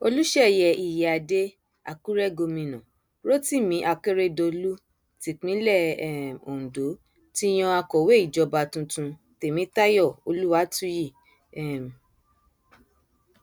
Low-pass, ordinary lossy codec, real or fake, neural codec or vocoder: none; none; real; none